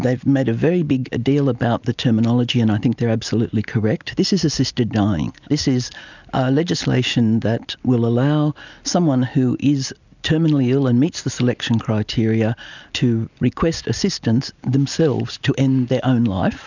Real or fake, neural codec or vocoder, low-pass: real; none; 7.2 kHz